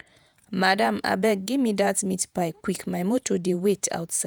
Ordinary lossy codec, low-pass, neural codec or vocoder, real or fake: none; none; vocoder, 48 kHz, 128 mel bands, Vocos; fake